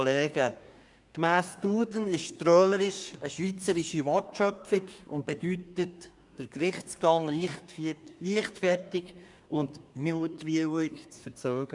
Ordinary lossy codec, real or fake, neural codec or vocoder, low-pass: none; fake; codec, 24 kHz, 1 kbps, SNAC; 10.8 kHz